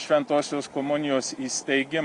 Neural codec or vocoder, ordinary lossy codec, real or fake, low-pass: none; Opus, 64 kbps; real; 10.8 kHz